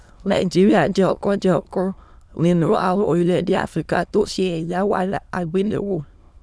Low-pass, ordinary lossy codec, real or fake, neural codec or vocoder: none; none; fake; autoencoder, 22.05 kHz, a latent of 192 numbers a frame, VITS, trained on many speakers